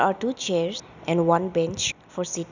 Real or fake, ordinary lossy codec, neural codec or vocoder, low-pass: real; none; none; 7.2 kHz